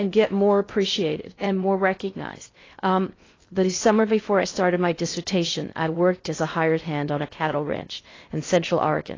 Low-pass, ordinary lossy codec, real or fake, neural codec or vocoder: 7.2 kHz; AAC, 32 kbps; fake; codec, 16 kHz in and 24 kHz out, 0.6 kbps, FocalCodec, streaming, 2048 codes